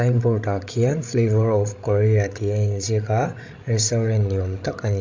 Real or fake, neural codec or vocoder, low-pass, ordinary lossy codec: fake; codec, 16 kHz, 16 kbps, FreqCodec, larger model; 7.2 kHz; MP3, 64 kbps